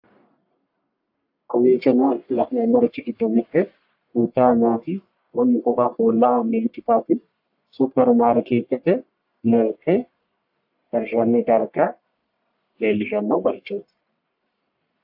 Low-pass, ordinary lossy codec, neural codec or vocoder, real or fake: 5.4 kHz; AAC, 48 kbps; codec, 44.1 kHz, 1.7 kbps, Pupu-Codec; fake